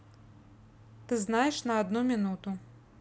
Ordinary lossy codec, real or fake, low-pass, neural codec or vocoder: none; real; none; none